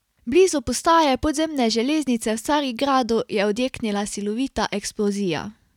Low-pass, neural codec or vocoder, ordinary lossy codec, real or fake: 19.8 kHz; none; none; real